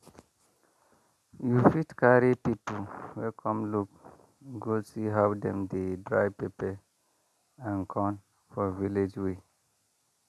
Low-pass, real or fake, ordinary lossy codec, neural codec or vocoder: 14.4 kHz; real; none; none